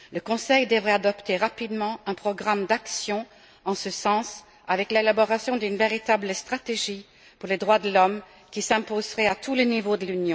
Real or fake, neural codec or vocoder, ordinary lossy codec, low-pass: real; none; none; none